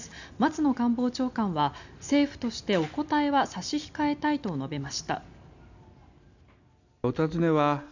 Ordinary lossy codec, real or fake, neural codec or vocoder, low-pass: none; real; none; 7.2 kHz